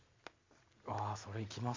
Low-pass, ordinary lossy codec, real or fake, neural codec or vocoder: 7.2 kHz; MP3, 48 kbps; real; none